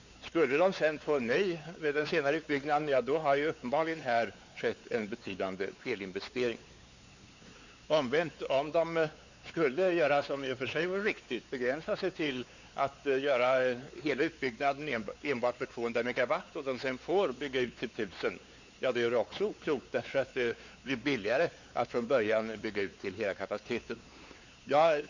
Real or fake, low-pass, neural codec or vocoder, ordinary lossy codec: fake; 7.2 kHz; codec, 16 kHz, 4 kbps, X-Codec, WavLM features, trained on Multilingual LibriSpeech; Opus, 64 kbps